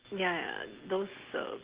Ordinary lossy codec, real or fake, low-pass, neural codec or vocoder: Opus, 16 kbps; real; 3.6 kHz; none